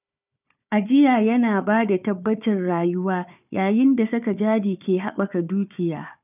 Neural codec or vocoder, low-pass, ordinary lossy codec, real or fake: codec, 16 kHz, 4 kbps, FunCodec, trained on Chinese and English, 50 frames a second; 3.6 kHz; none; fake